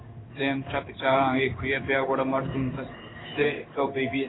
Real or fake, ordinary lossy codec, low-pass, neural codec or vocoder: fake; AAC, 16 kbps; 7.2 kHz; codec, 16 kHz in and 24 kHz out, 1 kbps, XY-Tokenizer